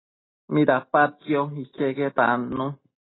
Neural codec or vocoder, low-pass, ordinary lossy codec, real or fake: none; 7.2 kHz; AAC, 16 kbps; real